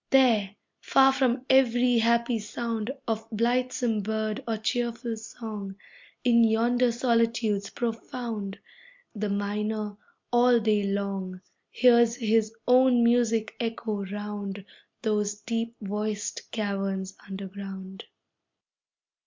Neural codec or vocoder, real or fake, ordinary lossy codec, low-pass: none; real; MP3, 64 kbps; 7.2 kHz